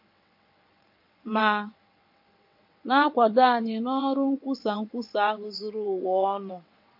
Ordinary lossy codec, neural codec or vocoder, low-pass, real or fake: MP3, 32 kbps; vocoder, 22.05 kHz, 80 mel bands, WaveNeXt; 5.4 kHz; fake